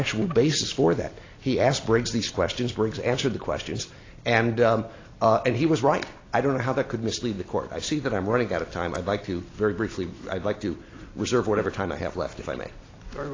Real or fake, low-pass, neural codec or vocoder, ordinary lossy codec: real; 7.2 kHz; none; AAC, 32 kbps